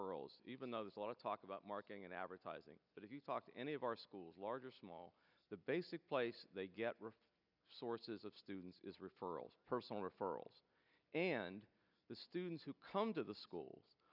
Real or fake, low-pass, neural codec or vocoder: real; 5.4 kHz; none